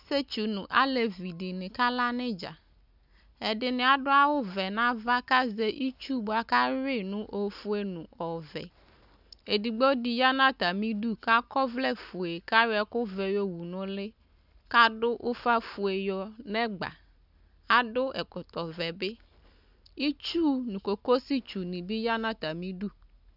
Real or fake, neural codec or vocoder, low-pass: real; none; 5.4 kHz